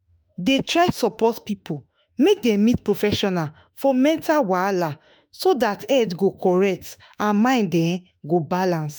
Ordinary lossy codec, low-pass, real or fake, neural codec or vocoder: none; none; fake; autoencoder, 48 kHz, 32 numbers a frame, DAC-VAE, trained on Japanese speech